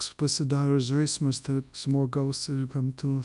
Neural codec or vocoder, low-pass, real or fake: codec, 24 kHz, 0.9 kbps, WavTokenizer, large speech release; 10.8 kHz; fake